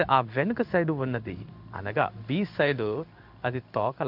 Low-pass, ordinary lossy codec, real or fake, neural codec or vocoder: 5.4 kHz; none; fake; codec, 16 kHz in and 24 kHz out, 1 kbps, XY-Tokenizer